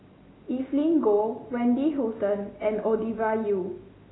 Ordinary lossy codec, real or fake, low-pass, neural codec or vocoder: AAC, 16 kbps; real; 7.2 kHz; none